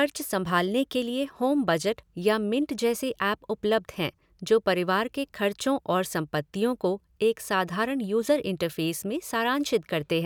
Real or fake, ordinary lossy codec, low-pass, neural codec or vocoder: real; none; none; none